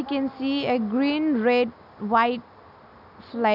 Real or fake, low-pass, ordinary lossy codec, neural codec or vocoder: real; 5.4 kHz; none; none